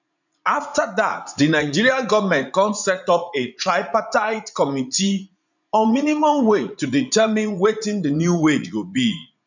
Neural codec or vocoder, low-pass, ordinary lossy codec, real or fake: vocoder, 44.1 kHz, 80 mel bands, Vocos; 7.2 kHz; none; fake